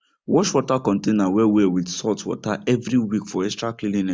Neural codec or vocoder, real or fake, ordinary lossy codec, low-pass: none; real; none; none